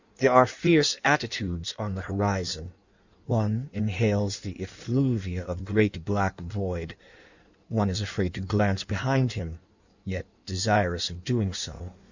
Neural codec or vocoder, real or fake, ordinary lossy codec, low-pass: codec, 16 kHz in and 24 kHz out, 1.1 kbps, FireRedTTS-2 codec; fake; Opus, 64 kbps; 7.2 kHz